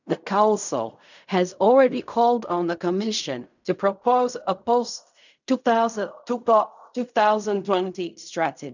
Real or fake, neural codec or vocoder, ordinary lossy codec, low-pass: fake; codec, 16 kHz in and 24 kHz out, 0.4 kbps, LongCat-Audio-Codec, fine tuned four codebook decoder; none; 7.2 kHz